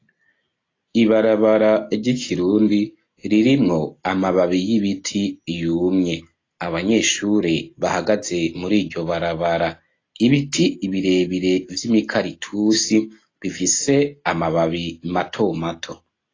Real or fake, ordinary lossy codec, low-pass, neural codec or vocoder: real; AAC, 32 kbps; 7.2 kHz; none